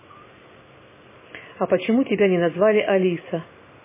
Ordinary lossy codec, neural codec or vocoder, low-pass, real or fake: MP3, 16 kbps; none; 3.6 kHz; real